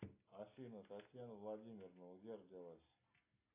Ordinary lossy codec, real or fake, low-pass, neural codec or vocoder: AAC, 24 kbps; real; 3.6 kHz; none